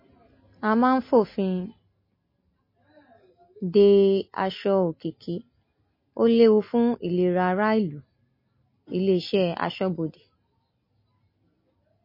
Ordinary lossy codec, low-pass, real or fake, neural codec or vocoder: MP3, 24 kbps; 5.4 kHz; real; none